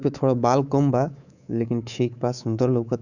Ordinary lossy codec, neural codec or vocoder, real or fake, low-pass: none; codec, 24 kHz, 3.1 kbps, DualCodec; fake; 7.2 kHz